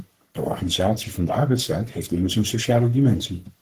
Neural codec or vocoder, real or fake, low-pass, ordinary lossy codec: codec, 44.1 kHz, 3.4 kbps, Pupu-Codec; fake; 14.4 kHz; Opus, 16 kbps